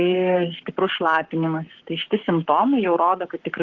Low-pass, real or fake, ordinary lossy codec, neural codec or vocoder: 7.2 kHz; fake; Opus, 16 kbps; codec, 44.1 kHz, 7.8 kbps, Pupu-Codec